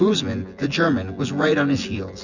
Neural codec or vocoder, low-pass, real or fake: vocoder, 24 kHz, 100 mel bands, Vocos; 7.2 kHz; fake